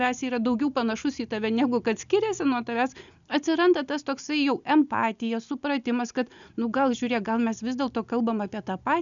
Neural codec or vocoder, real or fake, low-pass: none; real; 7.2 kHz